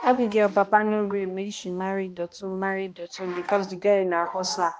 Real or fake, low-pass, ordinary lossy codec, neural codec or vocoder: fake; none; none; codec, 16 kHz, 1 kbps, X-Codec, HuBERT features, trained on balanced general audio